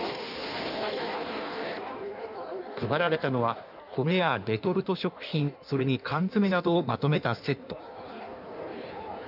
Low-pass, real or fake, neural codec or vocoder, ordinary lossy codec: 5.4 kHz; fake; codec, 16 kHz in and 24 kHz out, 1.1 kbps, FireRedTTS-2 codec; none